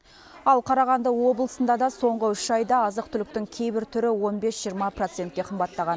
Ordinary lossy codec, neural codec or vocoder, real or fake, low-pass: none; none; real; none